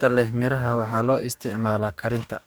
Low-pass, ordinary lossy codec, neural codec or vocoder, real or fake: none; none; codec, 44.1 kHz, 2.6 kbps, DAC; fake